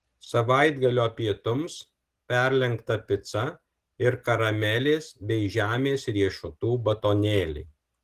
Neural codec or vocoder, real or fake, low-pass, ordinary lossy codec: none; real; 14.4 kHz; Opus, 16 kbps